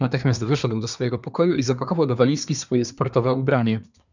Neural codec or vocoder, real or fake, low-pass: codec, 24 kHz, 1 kbps, SNAC; fake; 7.2 kHz